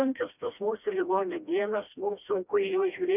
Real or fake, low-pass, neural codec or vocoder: fake; 3.6 kHz; codec, 16 kHz, 1 kbps, FreqCodec, smaller model